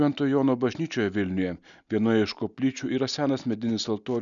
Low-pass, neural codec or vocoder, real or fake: 7.2 kHz; none; real